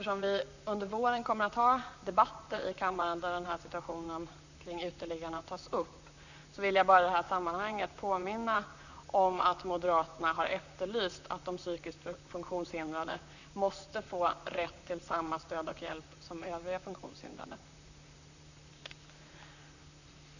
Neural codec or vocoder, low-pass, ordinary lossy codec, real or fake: vocoder, 44.1 kHz, 128 mel bands, Pupu-Vocoder; 7.2 kHz; none; fake